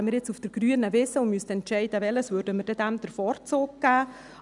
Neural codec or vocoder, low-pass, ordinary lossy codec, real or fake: none; 10.8 kHz; none; real